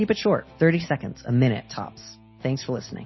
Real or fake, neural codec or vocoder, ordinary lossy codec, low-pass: real; none; MP3, 24 kbps; 7.2 kHz